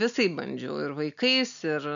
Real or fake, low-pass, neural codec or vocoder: real; 7.2 kHz; none